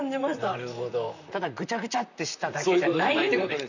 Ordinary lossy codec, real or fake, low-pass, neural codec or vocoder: none; fake; 7.2 kHz; vocoder, 44.1 kHz, 128 mel bands, Pupu-Vocoder